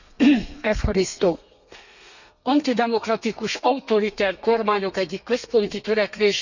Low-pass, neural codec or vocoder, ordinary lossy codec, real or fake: 7.2 kHz; codec, 32 kHz, 1.9 kbps, SNAC; none; fake